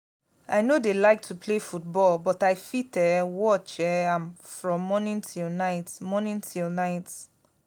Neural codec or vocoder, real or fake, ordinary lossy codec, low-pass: none; real; none; none